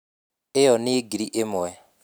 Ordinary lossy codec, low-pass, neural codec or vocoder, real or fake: none; none; none; real